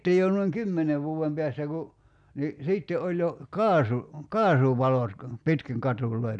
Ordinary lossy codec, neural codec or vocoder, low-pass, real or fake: none; none; 9.9 kHz; real